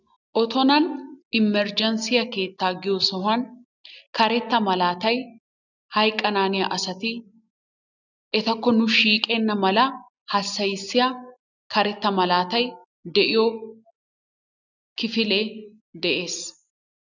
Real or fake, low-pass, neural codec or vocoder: real; 7.2 kHz; none